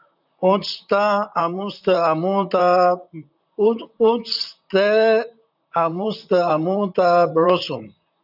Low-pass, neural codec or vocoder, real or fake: 5.4 kHz; vocoder, 44.1 kHz, 128 mel bands, Pupu-Vocoder; fake